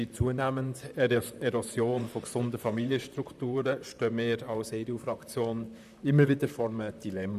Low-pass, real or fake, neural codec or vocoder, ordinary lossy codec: 14.4 kHz; fake; vocoder, 44.1 kHz, 128 mel bands, Pupu-Vocoder; none